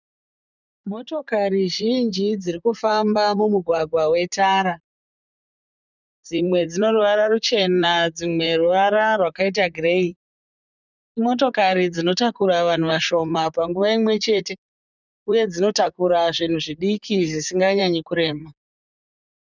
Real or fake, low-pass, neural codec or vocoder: fake; 7.2 kHz; vocoder, 44.1 kHz, 128 mel bands, Pupu-Vocoder